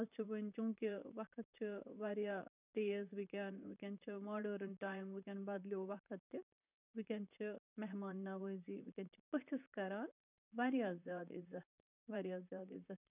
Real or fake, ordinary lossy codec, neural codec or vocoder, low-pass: fake; none; vocoder, 44.1 kHz, 128 mel bands, Pupu-Vocoder; 3.6 kHz